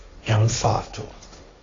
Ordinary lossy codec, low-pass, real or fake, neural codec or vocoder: AAC, 32 kbps; 7.2 kHz; fake; codec, 16 kHz, 1.1 kbps, Voila-Tokenizer